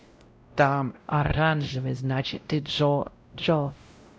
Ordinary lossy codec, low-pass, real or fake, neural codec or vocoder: none; none; fake; codec, 16 kHz, 0.5 kbps, X-Codec, WavLM features, trained on Multilingual LibriSpeech